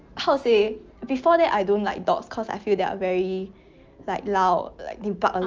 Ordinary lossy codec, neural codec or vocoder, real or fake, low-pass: Opus, 24 kbps; none; real; 7.2 kHz